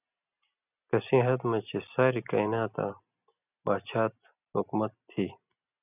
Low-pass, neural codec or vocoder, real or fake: 3.6 kHz; none; real